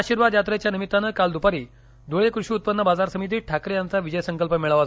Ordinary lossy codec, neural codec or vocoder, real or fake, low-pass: none; none; real; none